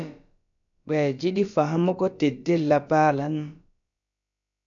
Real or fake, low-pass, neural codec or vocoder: fake; 7.2 kHz; codec, 16 kHz, about 1 kbps, DyCAST, with the encoder's durations